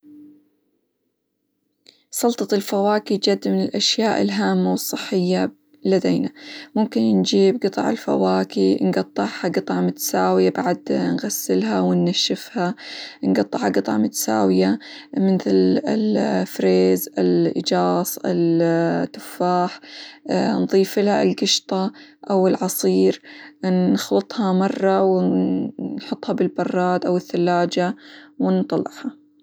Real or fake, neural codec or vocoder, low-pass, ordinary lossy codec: real; none; none; none